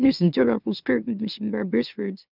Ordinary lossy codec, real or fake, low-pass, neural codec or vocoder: none; fake; 5.4 kHz; autoencoder, 44.1 kHz, a latent of 192 numbers a frame, MeloTTS